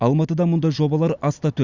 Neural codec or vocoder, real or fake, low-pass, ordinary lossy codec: none; real; 7.2 kHz; Opus, 64 kbps